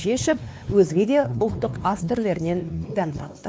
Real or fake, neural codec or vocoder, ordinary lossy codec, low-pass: fake; codec, 16 kHz, 2 kbps, X-Codec, WavLM features, trained on Multilingual LibriSpeech; none; none